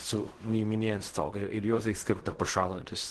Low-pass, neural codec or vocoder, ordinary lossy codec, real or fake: 10.8 kHz; codec, 16 kHz in and 24 kHz out, 0.4 kbps, LongCat-Audio-Codec, fine tuned four codebook decoder; Opus, 16 kbps; fake